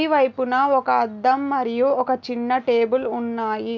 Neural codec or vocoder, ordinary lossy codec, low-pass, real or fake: none; none; none; real